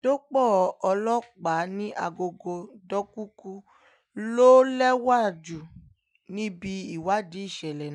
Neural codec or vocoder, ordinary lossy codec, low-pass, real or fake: none; none; 10.8 kHz; real